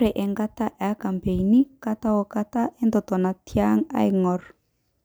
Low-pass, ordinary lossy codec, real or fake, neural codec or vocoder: none; none; real; none